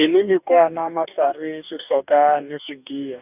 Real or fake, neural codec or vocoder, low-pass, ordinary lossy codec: fake; codec, 44.1 kHz, 2.6 kbps, DAC; 3.6 kHz; none